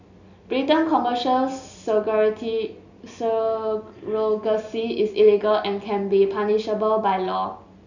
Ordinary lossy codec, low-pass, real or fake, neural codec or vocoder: none; 7.2 kHz; real; none